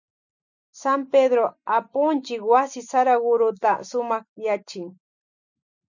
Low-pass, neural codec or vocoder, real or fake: 7.2 kHz; none; real